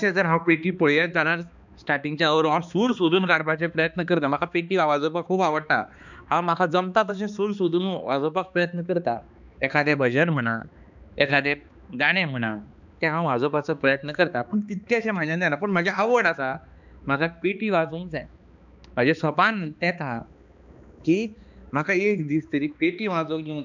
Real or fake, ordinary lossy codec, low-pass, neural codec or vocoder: fake; none; 7.2 kHz; codec, 16 kHz, 2 kbps, X-Codec, HuBERT features, trained on balanced general audio